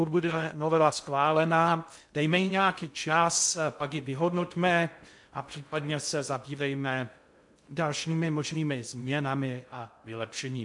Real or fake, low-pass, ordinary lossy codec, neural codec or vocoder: fake; 10.8 kHz; MP3, 64 kbps; codec, 16 kHz in and 24 kHz out, 0.6 kbps, FocalCodec, streaming, 2048 codes